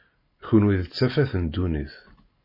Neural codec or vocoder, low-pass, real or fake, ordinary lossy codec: none; 5.4 kHz; real; MP3, 24 kbps